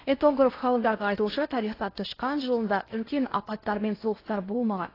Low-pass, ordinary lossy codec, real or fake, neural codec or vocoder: 5.4 kHz; AAC, 24 kbps; fake; codec, 16 kHz in and 24 kHz out, 0.8 kbps, FocalCodec, streaming, 65536 codes